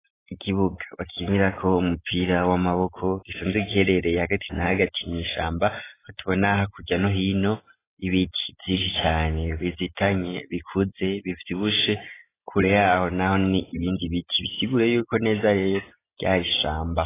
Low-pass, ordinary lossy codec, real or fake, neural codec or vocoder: 3.6 kHz; AAC, 16 kbps; real; none